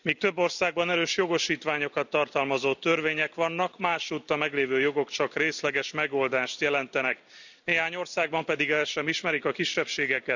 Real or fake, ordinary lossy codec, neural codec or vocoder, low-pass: real; none; none; 7.2 kHz